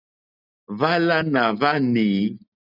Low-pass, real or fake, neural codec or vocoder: 5.4 kHz; real; none